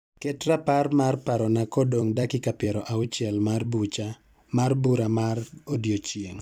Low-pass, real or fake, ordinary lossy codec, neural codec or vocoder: 19.8 kHz; fake; none; vocoder, 44.1 kHz, 128 mel bands every 256 samples, BigVGAN v2